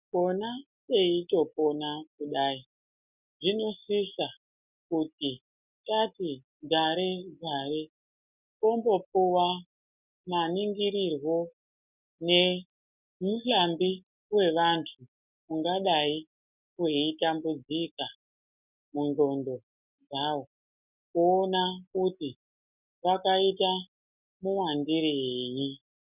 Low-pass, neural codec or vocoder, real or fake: 3.6 kHz; none; real